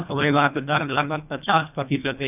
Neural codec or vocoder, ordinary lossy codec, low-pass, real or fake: codec, 24 kHz, 1.5 kbps, HILCodec; none; 3.6 kHz; fake